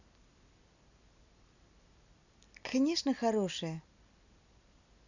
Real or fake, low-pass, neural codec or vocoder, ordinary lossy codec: real; 7.2 kHz; none; none